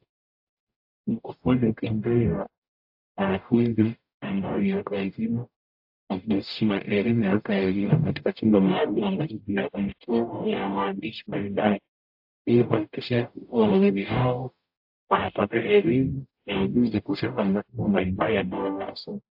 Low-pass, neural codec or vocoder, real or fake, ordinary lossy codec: 5.4 kHz; codec, 44.1 kHz, 0.9 kbps, DAC; fake; AAC, 48 kbps